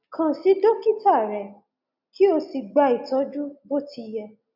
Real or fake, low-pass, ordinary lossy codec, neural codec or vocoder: real; 5.4 kHz; none; none